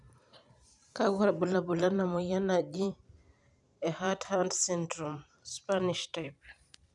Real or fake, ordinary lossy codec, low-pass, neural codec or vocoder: fake; none; 10.8 kHz; vocoder, 44.1 kHz, 128 mel bands every 512 samples, BigVGAN v2